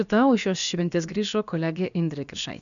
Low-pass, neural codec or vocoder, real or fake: 7.2 kHz; codec, 16 kHz, about 1 kbps, DyCAST, with the encoder's durations; fake